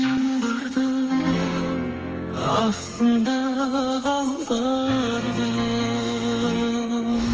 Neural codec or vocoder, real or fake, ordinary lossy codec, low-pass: codec, 32 kHz, 1.9 kbps, SNAC; fake; Opus, 24 kbps; 7.2 kHz